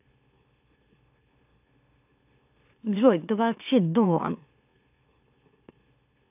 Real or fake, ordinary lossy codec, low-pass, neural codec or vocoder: fake; AAC, 32 kbps; 3.6 kHz; autoencoder, 44.1 kHz, a latent of 192 numbers a frame, MeloTTS